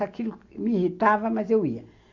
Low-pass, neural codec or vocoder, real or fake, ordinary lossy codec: 7.2 kHz; none; real; AAC, 48 kbps